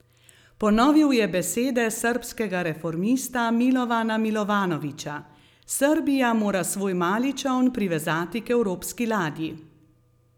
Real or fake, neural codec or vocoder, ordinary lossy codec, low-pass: real; none; none; 19.8 kHz